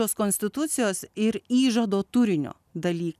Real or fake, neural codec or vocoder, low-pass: real; none; 14.4 kHz